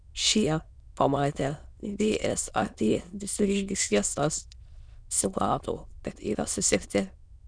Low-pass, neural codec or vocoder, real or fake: 9.9 kHz; autoencoder, 22.05 kHz, a latent of 192 numbers a frame, VITS, trained on many speakers; fake